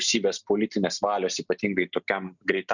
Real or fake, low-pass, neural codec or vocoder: real; 7.2 kHz; none